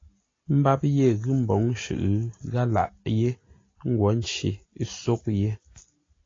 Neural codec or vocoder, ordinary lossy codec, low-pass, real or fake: none; AAC, 32 kbps; 7.2 kHz; real